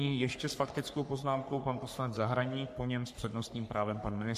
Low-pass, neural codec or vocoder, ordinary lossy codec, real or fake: 14.4 kHz; codec, 44.1 kHz, 3.4 kbps, Pupu-Codec; MP3, 64 kbps; fake